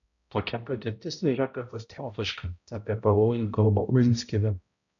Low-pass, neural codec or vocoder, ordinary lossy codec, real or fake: 7.2 kHz; codec, 16 kHz, 0.5 kbps, X-Codec, HuBERT features, trained on balanced general audio; MP3, 96 kbps; fake